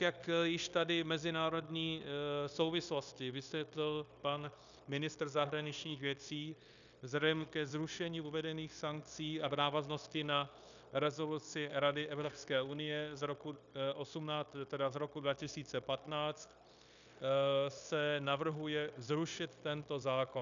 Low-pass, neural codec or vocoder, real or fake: 7.2 kHz; codec, 16 kHz, 0.9 kbps, LongCat-Audio-Codec; fake